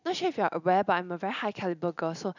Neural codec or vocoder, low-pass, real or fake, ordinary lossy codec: none; 7.2 kHz; real; none